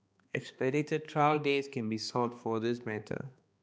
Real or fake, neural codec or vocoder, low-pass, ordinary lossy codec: fake; codec, 16 kHz, 2 kbps, X-Codec, HuBERT features, trained on balanced general audio; none; none